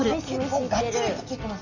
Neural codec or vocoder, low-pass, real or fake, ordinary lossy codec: none; 7.2 kHz; real; none